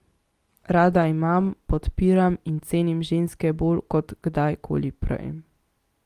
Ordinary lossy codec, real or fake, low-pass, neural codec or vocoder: Opus, 24 kbps; real; 14.4 kHz; none